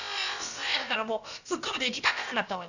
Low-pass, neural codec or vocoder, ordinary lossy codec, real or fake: 7.2 kHz; codec, 16 kHz, about 1 kbps, DyCAST, with the encoder's durations; none; fake